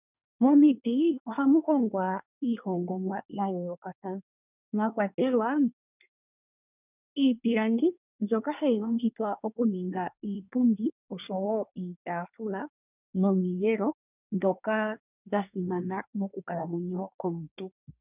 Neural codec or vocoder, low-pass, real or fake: codec, 24 kHz, 1 kbps, SNAC; 3.6 kHz; fake